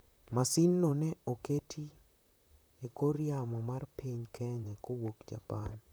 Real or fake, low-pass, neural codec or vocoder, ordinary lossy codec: fake; none; vocoder, 44.1 kHz, 128 mel bands, Pupu-Vocoder; none